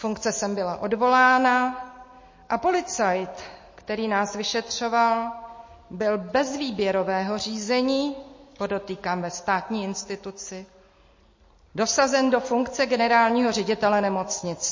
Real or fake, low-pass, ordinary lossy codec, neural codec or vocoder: real; 7.2 kHz; MP3, 32 kbps; none